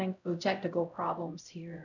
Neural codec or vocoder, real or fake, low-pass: codec, 16 kHz, 0.5 kbps, X-Codec, HuBERT features, trained on LibriSpeech; fake; 7.2 kHz